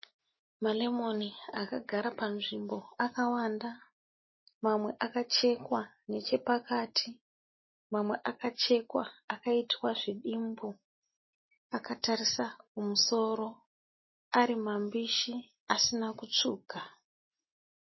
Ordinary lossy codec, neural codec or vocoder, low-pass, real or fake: MP3, 24 kbps; none; 7.2 kHz; real